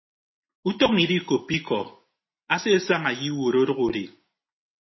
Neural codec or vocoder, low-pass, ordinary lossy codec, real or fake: none; 7.2 kHz; MP3, 24 kbps; real